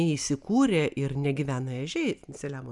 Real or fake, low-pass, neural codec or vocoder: real; 10.8 kHz; none